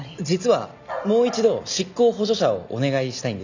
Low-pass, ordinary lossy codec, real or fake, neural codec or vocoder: 7.2 kHz; none; real; none